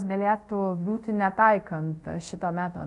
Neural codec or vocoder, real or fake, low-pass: codec, 24 kHz, 0.5 kbps, DualCodec; fake; 10.8 kHz